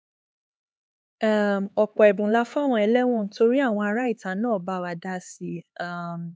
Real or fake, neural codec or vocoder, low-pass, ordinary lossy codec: fake; codec, 16 kHz, 4 kbps, X-Codec, HuBERT features, trained on LibriSpeech; none; none